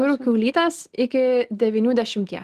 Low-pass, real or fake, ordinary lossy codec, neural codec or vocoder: 14.4 kHz; real; Opus, 24 kbps; none